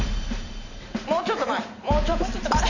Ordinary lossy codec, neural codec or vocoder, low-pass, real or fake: none; vocoder, 44.1 kHz, 128 mel bands every 512 samples, BigVGAN v2; 7.2 kHz; fake